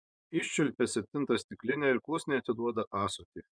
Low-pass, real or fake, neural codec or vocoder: 9.9 kHz; fake; vocoder, 24 kHz, 100 mel bands, Vocos